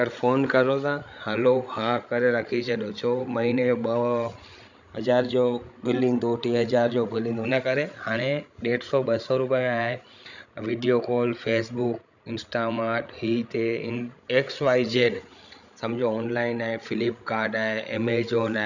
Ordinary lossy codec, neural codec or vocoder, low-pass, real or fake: none; codec, 16 kHz, 16 kbps, FreqCodec, larger model; 7.2 kHz; fake